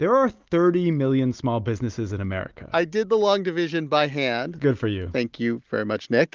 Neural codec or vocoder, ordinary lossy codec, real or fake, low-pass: none; Opus, 32 kbps; real; 7.2 kHz